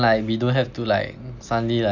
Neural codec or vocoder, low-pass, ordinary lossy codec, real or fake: none; 7.2 kHz; none; real